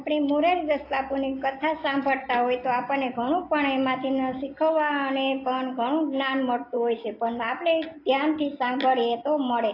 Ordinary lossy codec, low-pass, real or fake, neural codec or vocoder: AAC, 32 kbps; 5.4 kHz; real; none